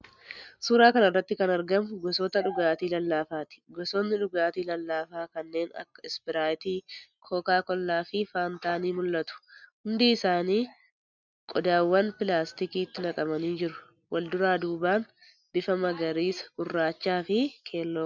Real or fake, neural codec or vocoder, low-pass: real; none; 7.2 kHz